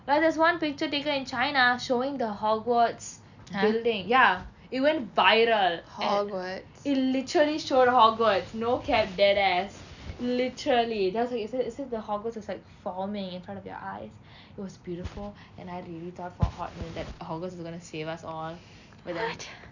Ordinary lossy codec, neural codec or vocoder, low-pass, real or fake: none; none; 7.2 kHz; real